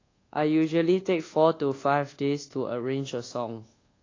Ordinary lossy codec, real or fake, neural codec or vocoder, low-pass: AAC, 32 kbps; fake; codec, 24 kHz, 1.2 kbps, DualCodec; 7.2 kHz